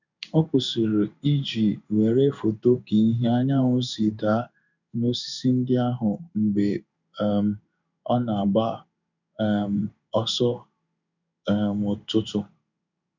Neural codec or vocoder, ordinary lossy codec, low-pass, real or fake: codec, 16 kHz in and 24 kHz out, 1 kbps, XY-Tokenizer; none; 7.2 kHz; fake